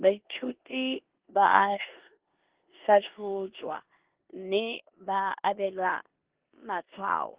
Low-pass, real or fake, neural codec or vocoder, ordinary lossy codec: 3.6 kHz; fake; codec, 16 kHz in and 24 kHz out, 0.9 kbps, LongCat-Audio-Codec, four codebook decoder; Opus, 16 kbps